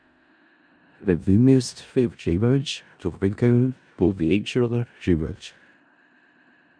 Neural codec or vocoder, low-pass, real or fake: codec, 16 kHz in and 24 kHz out, 0.4 kbps, LongCat-Audio-Codec, four codebook decoder; 9.9 kHz; fake